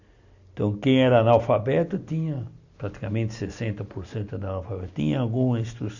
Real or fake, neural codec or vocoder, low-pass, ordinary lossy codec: real; none; 7.2 kHz; none